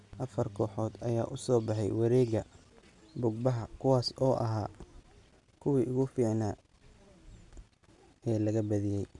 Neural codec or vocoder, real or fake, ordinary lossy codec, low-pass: none; real; none; 10.8 kHz